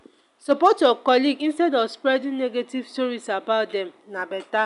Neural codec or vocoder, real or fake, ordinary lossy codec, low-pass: none; real; none; 10.8 kHz